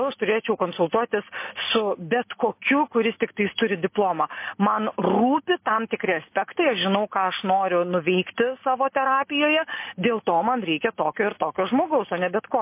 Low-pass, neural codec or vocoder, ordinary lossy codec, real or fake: 3.6 kHz; none; MP3, 24 kbps; real